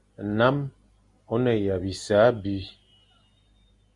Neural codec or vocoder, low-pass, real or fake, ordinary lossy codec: none; 10.8 kHz; real; Opus, 64 kbps